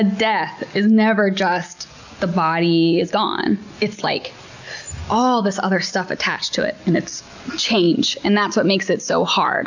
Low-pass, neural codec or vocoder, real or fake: 7.2 kHz; none; real